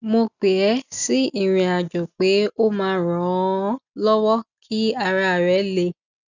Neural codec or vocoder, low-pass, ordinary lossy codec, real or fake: none; 7.2 kHz; none; real